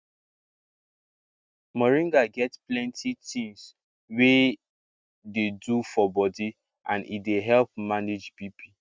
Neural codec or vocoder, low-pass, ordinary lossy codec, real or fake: none; none; none; real